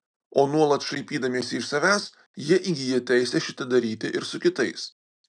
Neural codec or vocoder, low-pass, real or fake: none; 9.9 kHz; real